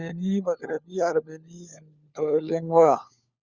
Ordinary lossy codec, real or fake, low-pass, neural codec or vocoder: Opus, 64 kbps; fake; 7.2 kHz; codec, 16 kHz, 4 kbps, FunCodec, trained on LibriTTS, 50 frames a second